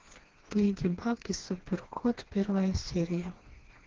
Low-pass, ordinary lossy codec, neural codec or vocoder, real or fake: 7.2 kHz; Opus, 16 kbps; codec, 16 kHz, 2 kbps, FreqCodec, smaller model; fake